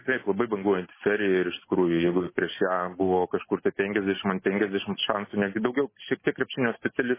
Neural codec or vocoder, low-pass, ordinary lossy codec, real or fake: none; 3.6 kHz; MP3, 16 kbps; real